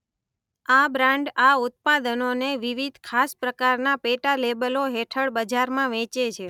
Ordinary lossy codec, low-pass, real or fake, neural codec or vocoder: none; 19.8 kHz; real; none